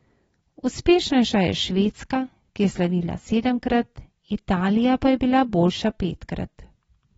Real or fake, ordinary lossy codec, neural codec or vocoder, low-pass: real; AAC, 24 kbps; none; 19.8 kHz